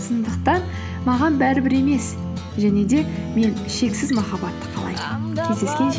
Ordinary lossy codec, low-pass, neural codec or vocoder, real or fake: none; none; none; real